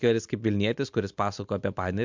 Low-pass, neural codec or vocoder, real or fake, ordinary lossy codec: 7.2 kHz; none; real; MP3, 64 kbps